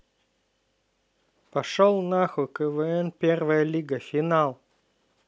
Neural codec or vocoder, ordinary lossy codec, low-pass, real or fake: none; none; none; real